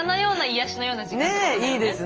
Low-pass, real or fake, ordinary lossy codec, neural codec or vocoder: 7.2 kHz; real; Opus, 24 kbps; none